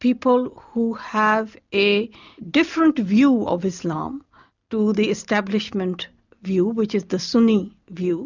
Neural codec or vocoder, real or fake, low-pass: none; real; 7.2 kHz